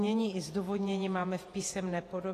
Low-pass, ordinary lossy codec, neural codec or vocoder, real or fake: 14.4 kHz; AAC, 48 kbps; vocoder, 48 kHz, 128 mel bands, Vocos; fake